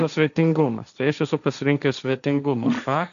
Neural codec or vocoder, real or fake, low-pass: codec, 16 kHz, 1.1 kbps, Voila-Tokenizer; fake; 7.2 kHz